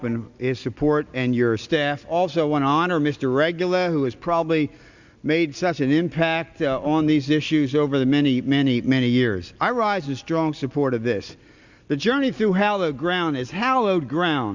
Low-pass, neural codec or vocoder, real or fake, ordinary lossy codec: 7.2 kHz; none; real; Opus, 64 kbps